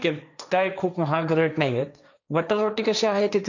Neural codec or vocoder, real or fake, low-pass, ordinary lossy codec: codec, 16 kHz, 1.1 kbps, Voila-Tokenizer; fake; 7.2 kHz; none